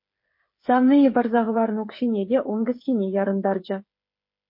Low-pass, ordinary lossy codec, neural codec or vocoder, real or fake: 5.4 kHz; MP3, 32 kbps; codec, 16 kHz, 8 kbps, FreqCodec, smaller model; fake